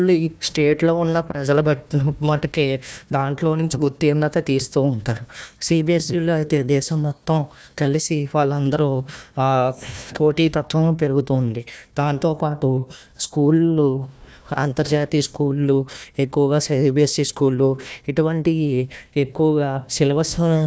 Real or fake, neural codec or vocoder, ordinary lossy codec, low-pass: fake; codec, 16 kHz, 1 kbps, FunCodec, trained on Chinese and English, 50 frames a second; none; none